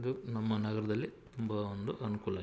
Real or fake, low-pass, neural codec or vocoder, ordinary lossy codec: real; none; none; none